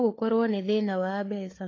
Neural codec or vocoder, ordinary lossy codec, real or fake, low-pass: codec, 16 kHz, 4 kbps, FunCodec, trained on LibriTTS, 50 frames a second; AAC, 32 kbps; fake; 7.2 kHz